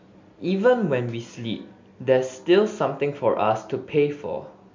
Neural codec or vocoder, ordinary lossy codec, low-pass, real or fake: none; MP3, 48 kbps; 7.2 kHz; real